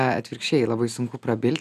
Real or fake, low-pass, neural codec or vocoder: real; 14.4 kHz; none